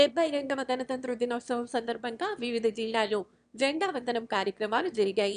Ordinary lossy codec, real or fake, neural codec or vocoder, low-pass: none; fake; autoencoder, 22.05 kHz, a latent of 192 numbers a frame, VITS, trained on one speaker; 9.9 kHz